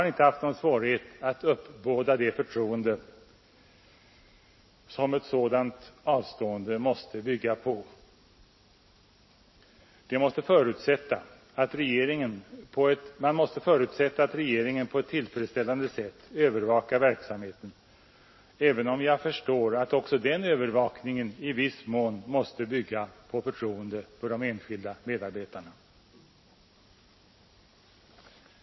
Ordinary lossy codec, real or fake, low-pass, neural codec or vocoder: MP3, 24 kbps; real; 7.2 kHz; none